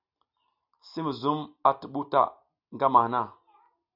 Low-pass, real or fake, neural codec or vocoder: 5.4 kHz; real; none